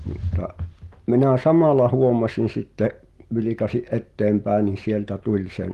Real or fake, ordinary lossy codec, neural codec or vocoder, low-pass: real; Opus, 24 kbps; none; 14.4 kHz